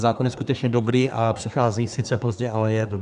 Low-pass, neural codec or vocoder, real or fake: 10.8 kHz; codec, 24 kHz, 1 kbps, SNAC; fake